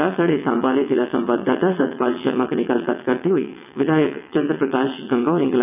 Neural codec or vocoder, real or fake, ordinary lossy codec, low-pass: vocoder, 22.05 kHz, 80 mel bands, WaveNeXt; fake; none; 3.6 kHz